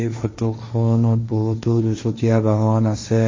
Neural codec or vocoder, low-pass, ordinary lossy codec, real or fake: codec, 16 kHz, 1.1 kbps, Voila-Tokenizer; 7.2 kHz; MP3, 32 kbps; fake